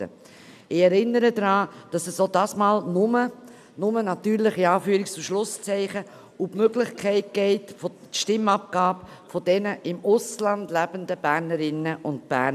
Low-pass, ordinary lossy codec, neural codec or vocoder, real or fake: 14.4 kHz; none; none; real